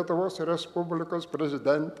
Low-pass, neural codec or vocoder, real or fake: 14.4 kHz; none; real